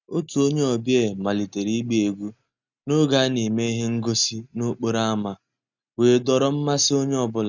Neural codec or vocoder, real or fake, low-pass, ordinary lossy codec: none; real; 7.2 kHz; none